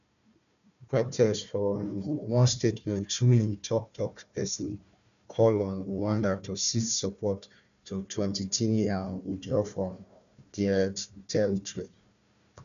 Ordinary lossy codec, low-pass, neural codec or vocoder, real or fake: none; 7.2 kHz; codec, 16 kHz, 1 kbps, FunCodec, trained on Chinese and English, 50 frames a second; fake